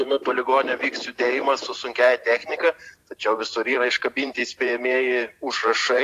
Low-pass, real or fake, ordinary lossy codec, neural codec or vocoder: 14.4 kHz; fake; AAC, 64 kbps; vocoder, 44.1 kHz, 128 mel bands every 512 samples, BigVGAN v2